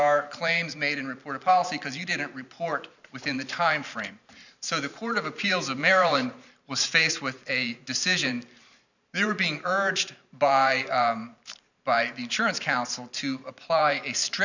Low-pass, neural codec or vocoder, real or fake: 7.2 kHz; vocoder, 44.1 kHz, 128 mel bands every 512 samples, BigVGAN v2; fake